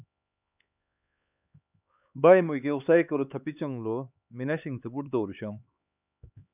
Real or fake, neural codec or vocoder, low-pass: fake; codec, 16 kHz, 4 kbps, X-Codec, HuBERT features, trained on LibriSpeech; 3.6 kHz